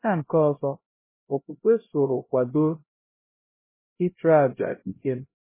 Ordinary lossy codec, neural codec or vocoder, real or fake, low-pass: MP3, 16 kbps; codec, 16 kHz, 0.5 kbps, X-Codec, HuBERT features, trained on LibriSpeech; fake; 3.6 kHz